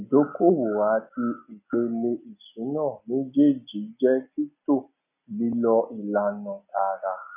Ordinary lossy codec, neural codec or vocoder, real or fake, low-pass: none; none; real; 3.6 kHz